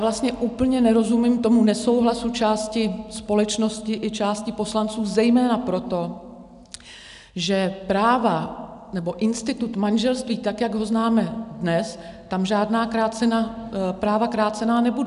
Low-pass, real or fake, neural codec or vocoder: 10.8 kHz; real; none